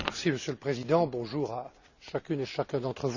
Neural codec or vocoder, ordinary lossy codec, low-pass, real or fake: none; none; 7.2 kHz; real